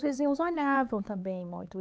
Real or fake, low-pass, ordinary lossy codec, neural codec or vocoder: fake; none; none; codec, 16 kHz, 4 kbps, X-Codec, HuBERT features, trained on LibriSpeech